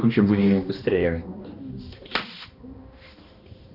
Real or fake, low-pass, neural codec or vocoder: fake; 5.4 kHz; codec, 16 kHz, 1 kbps, X-Codec, HuBERT features, trained on balanced general audio